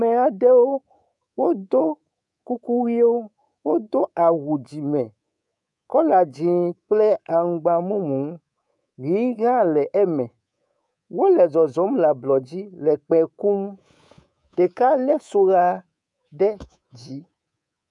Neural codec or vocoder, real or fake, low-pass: codec, 24 kHz, 3.1 kbps, DualCodec; fake; 10.8 kHz